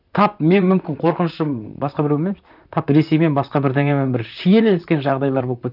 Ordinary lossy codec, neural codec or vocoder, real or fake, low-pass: none; vocoder, 44.1 kHz, 128 mel bands, Pupu-Vocoder; fake; 5.4 kHz